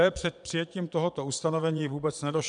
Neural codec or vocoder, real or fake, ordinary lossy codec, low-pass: vocoder, 22.05 kHz, 80 mel bands, Vocos; fake; MP3, 96 kbps; 9.9 kHz